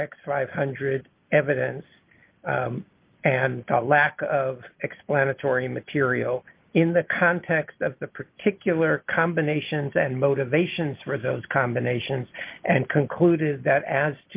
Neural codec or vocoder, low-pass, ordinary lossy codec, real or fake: none; 3.6 kHz; Opus, 32 kbps; real